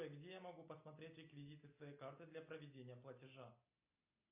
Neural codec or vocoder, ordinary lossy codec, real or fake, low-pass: none; AAC, 32 kbps; real; 3.6 kHz